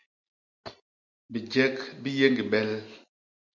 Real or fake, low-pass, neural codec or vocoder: real; 7.2 kHz; none